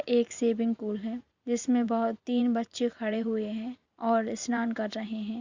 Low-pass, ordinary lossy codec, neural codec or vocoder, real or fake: 7.2 kHz; Opus, 64 kbps; vocoder, 44.1 kHz, 128 mel bands every 512 samples, BigVGAN v2; fake